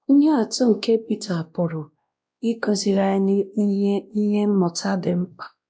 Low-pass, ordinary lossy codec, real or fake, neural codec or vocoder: none; none; fake; codec, 16 kHz, 1 kbps, X-Codec, WavLM features, trained on Multilingual LibriSpeech